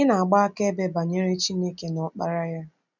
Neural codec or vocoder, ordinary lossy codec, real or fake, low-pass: none; none; real; 7.2 kHz